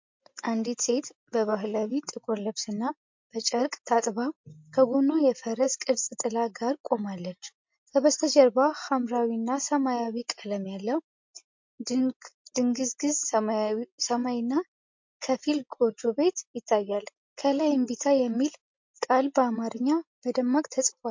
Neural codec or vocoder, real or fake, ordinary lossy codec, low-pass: none; real; MP3, 48 kbps; 7.2 kHz